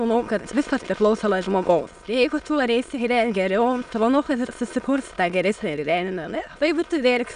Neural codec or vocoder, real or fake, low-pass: autoencoder, 22.05 kHz, a latent of 192 numbers a frame, VITS, trained on many speakers; fake; 9.9 kHz